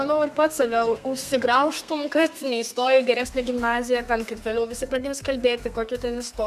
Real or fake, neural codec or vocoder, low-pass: fake; codec, 32 kHz, 1.9 kbps, SNAC; 14.4 kHz